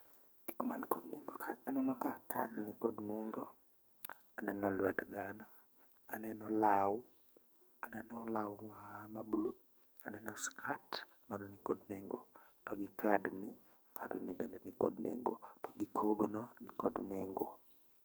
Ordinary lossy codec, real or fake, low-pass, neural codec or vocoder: none; fake; none; codec, 44.1 kHz, 2.6 kbps, SNAC